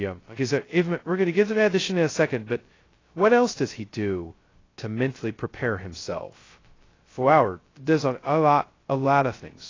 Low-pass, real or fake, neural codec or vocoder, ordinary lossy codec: 7.2 kHz; fake; codec, 16 kHz, 0.2 kbps, FocalCodec; AAC, 32 kbps